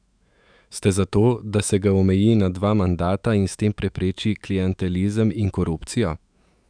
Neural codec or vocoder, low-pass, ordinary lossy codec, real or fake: autoencoder, 48 kHz, 128 numbers a frame, DAC-VAE, trained on Japanese speech; 9.9 kHz; none; fake